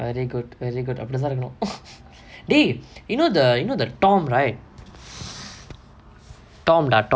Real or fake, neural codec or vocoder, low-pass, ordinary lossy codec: real; none; none; none